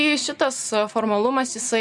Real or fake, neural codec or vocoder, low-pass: real; none; 10.8 kHz